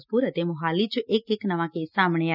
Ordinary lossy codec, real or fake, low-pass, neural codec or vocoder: none; real; 5.4 kHz; none